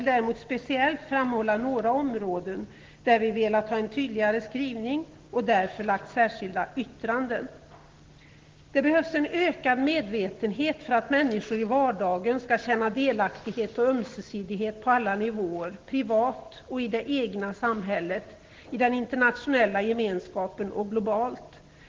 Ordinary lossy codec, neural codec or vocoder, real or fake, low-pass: Opus, 16 kbps; none; real; 7.2 kHz